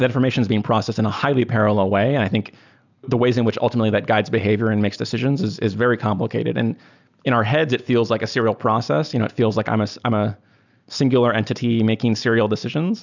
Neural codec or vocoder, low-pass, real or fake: none; 7.2 kHz; real